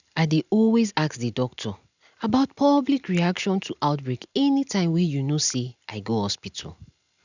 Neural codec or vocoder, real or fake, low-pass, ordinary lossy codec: none; real; 7.2 kHz; none